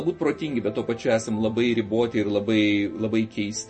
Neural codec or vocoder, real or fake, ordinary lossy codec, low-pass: none; real; MP3, 32 kbps; 10.8 kHz